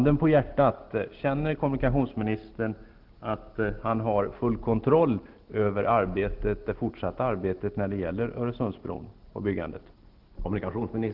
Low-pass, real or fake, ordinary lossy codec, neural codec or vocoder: 5.4 kHz; real; Opus, 16 kbps; none